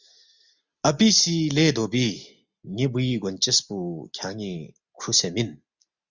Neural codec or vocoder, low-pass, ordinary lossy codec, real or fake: none; 7.2 kHz; Opus, 64 kbps; real